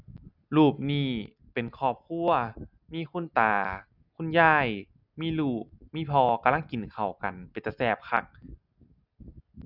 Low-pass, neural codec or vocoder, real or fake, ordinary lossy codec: 5.4 kHz; none; real; none